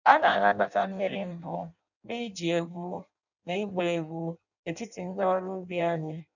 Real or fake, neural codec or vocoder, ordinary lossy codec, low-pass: fake; codec, 16 kHz in and 24 kHz out, 0.6 kbps, FireRedTTS-2 codec; none; 7.2 kHz